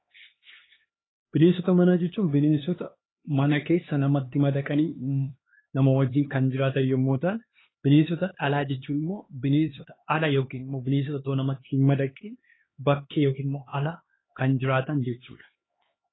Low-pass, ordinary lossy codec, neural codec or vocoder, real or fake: 7.2 kHz; AAC, 16 kbps; codec, 16 kHz, 2 kbps, X-Codec, HuBERT features, trained on LibriSpeech; fake